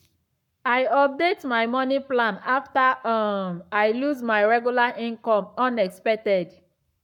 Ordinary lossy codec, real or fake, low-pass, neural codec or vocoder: none; fake; 19.8 kHz; codec, 44.1 kHz, 7.8 kbps, Pupu-Codec